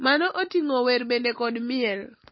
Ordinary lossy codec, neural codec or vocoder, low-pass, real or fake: MP3, 24 kbps; none; 7.2 kHz; real